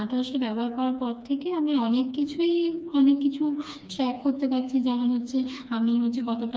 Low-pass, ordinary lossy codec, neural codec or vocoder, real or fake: none; none; codec, 16 kHz, 2 kbps, FreqCodec, smaller model; fake